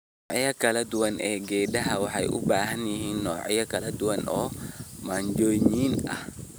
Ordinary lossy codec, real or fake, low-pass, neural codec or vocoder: none; real; none; none